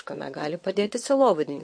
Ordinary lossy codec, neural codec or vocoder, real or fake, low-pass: MP3, 48 kbps; vocoder, 22.05 kHz, 80 mel bands, WaveNeXt; fake; 9.9 kHz